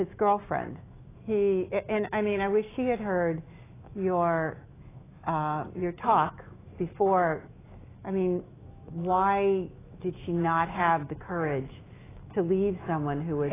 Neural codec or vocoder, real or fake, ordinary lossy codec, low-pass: autoencoder, 48 kHz, 128 numbers a frame, DAC-VAE, trained on Japanese speech; fake; AAC, 16 kbps; 3.6 kHz